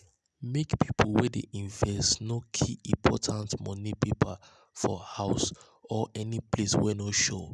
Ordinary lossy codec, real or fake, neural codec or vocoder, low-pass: none; real; none; none